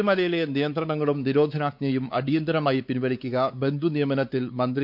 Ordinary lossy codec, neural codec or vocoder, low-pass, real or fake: none; codec, 16 kHz, 4 kbps, X-Codec, WavLM features, trained on Multilingual LibriSpeech; 5.4 kHz; fake